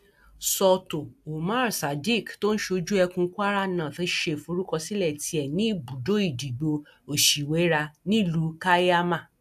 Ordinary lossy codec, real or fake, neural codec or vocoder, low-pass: AAC, 96 kbps; real; none; 14.4 kHz